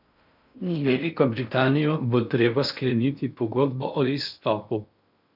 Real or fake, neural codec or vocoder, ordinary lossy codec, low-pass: fake; codec, 16 kHz in and 24 kHz out, 0.6 kbps, FocalCodec, streaming, 4096 codes; none; 5.4 kHz